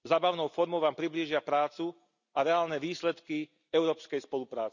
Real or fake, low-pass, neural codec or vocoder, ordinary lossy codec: real; 7.2 kHz; none; none